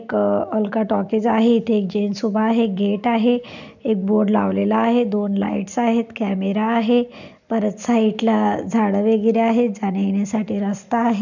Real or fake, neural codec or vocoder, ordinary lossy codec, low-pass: real; none; none; 7.2 kHz